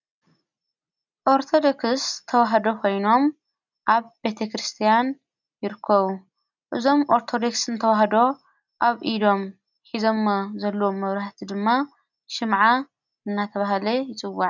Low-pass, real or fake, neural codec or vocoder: 7.2 kHz; real; none